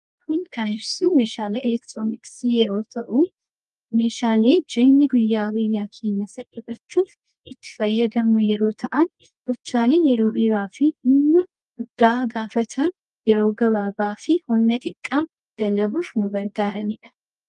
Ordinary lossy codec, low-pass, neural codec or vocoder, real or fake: Opus, 32 kbps; 10.8 kHz; codec, 24 kHz, 0.9 kbps, WavTokenizer, medium music audio release; fake